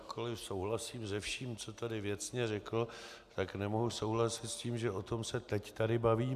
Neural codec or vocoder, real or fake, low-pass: none; real; 14.4 kHz